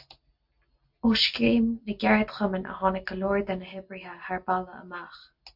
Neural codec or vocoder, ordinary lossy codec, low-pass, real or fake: none; MP3, 48 kbps; 5.4 kHz; real